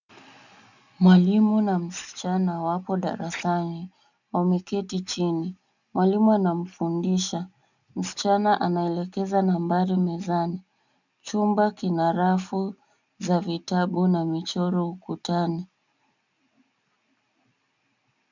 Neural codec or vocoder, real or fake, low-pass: none; real; 7.2 kHz